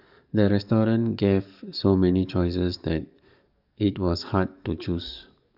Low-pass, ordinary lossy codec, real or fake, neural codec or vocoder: 5.4 kHz; none; fake; codec, 44.1 kHz, 7.8 kbps, DAC